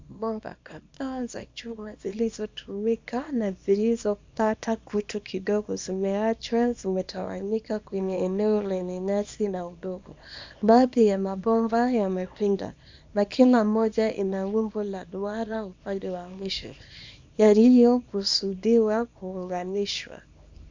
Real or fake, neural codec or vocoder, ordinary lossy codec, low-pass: fake; codec, 24 kHz, 0.9 kbps, WavTokenizer, small release; MP3, 64 kbps; 7.2 kHz